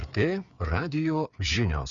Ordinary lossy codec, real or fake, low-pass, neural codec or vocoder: Opus, 64 kbps; fake; 7.2 kHz; codec, 16 kHz, 8 kbps, FreqCodec, smaller model